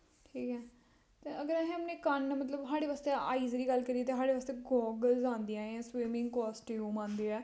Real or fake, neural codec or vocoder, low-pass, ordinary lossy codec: real; none; none; none